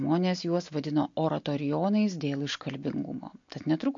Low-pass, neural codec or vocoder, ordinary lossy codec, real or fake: 7.2 kHz; none; MP3, 48 kbps; real